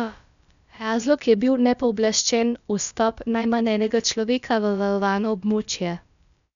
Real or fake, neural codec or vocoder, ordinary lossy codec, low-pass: fake; codec, 16 kHz, about 1 kbps, DyCAST, with the encoder's durations; MP3, 96 kbps; 7.2 kHz